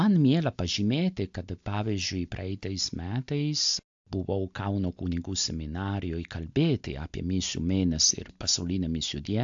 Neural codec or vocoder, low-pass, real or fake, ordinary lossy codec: none; 7.2 kHz; real; AAC, 64 kbps